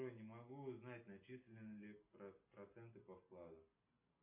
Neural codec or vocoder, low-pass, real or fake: none; 3.6 kHz; real